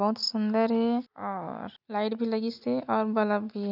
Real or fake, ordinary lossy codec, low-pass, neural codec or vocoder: real; none; 5.4 kHz; none